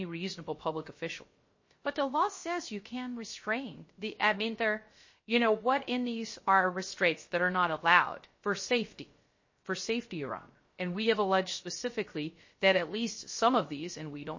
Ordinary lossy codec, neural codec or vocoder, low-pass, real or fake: MP3, 32 kbps; codec, 16 kHz, 0.3 kbps, FocalCodec; 7.2 kHz; fake